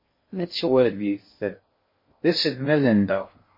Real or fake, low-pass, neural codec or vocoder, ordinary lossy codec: fake; 5.4 kHz; codec, 16 kHz in and 24 kHz out, 0.6 kbps, FocalCodec, streaming, 2048 codes; MP3, 24 kbps